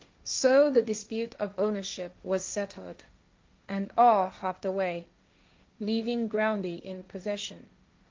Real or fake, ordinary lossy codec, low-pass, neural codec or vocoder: fake; Opus, 24 kbps; 7.2 kHz; codec, 16 kHz, 1.1 kbps, Voila-Tokenizer